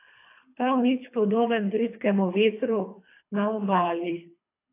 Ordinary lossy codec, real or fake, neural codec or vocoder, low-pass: AAC, 24 kbps; fake; codec, 24 kHz, 3 kbps, HILCodec; 3.6 kHz